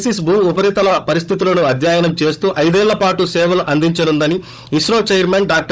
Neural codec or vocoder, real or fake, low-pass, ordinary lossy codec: codec, 16 kHz, 16 kbps, FunCodec, trained on Chinese and English, 50 frames a second; fake; none; none